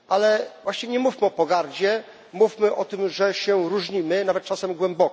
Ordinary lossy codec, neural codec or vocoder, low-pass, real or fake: none; none; none; real